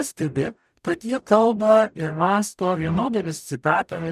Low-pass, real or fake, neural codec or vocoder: 14.4 kHz; fake; codec, 44.1 kHz, 0.9 kbps, DAC